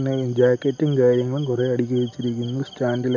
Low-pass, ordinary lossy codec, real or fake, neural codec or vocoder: 7.2 kHz; none; real; none